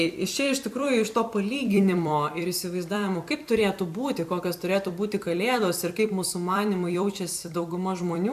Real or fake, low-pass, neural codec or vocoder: fake; 14.4 kHz; vocoder, 44.1 kHz, 128 mel bands every 256 samples, BigVGAN v2